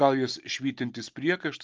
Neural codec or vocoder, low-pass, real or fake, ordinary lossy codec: none; 7.2 kHz; real; Opus, 24 kbps